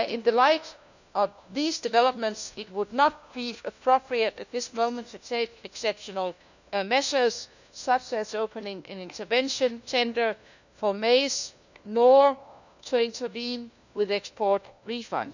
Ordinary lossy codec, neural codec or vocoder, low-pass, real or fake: none; codec, 16 kHz, 1 kbps, FunCodec, trained on LibriTTS, 50 frames a second; 7.2 kHz; fake